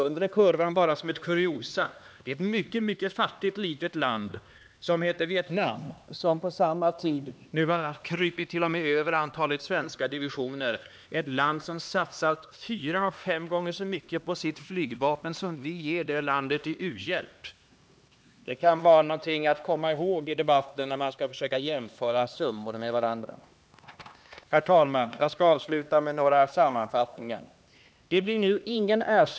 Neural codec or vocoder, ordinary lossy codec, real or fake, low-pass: codec, 16 kHz, 2 kbps, X-Codec, HuBERT features, trained on LibriSpeech; none; fake; none